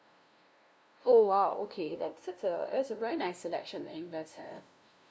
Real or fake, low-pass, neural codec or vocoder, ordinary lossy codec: fake; none; codec, 16 kHz, 0.5 kbps, FunCodec, trained on LibriTTS, 25 frames a second; none